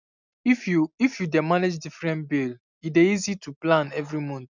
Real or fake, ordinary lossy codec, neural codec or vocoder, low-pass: real; none; none; 7.2 kHz